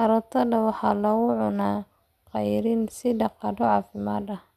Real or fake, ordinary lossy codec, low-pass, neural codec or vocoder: real; none; 14.4 kHz; none